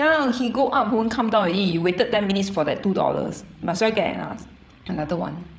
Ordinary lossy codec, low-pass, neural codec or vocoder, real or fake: none; none; codec, 16 kHz, 8 kbps, FreqCodec, larger model; fake